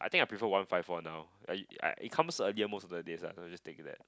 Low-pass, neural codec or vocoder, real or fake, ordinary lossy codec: none; none; real; none